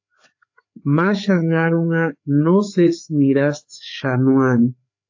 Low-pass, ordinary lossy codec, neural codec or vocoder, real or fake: 7.2 kHz; AAC, 48 kbps; codec, 16 kHz, 4 kbps, FreqCodec, larger model; fake